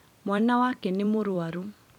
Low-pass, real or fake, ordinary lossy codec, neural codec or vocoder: 19.8 kHz; fake; none; vocoder, 44.1 kHz, 128 mel bands every 256 samples, BigVGAN v2